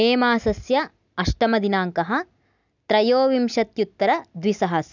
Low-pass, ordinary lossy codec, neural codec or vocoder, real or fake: 7.2 kHz; none; none; real